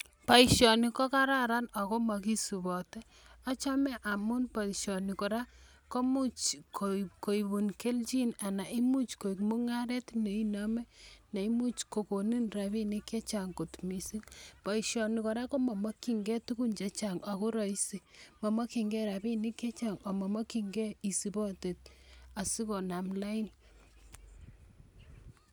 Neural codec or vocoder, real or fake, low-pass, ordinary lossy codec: none; real; none; none